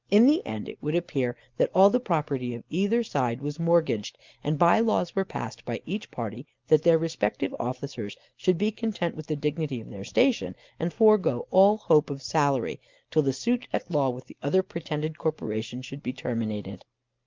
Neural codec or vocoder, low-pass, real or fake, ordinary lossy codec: none; 7.2 kHz; real; Opus, 24 kbps